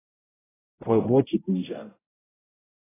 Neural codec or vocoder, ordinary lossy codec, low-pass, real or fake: codec, 16 kHz, 0.5 kbps, X-Codec, HuBERT features, trained on general audio; AAC, 16 kbps; 3.6 kHz; fake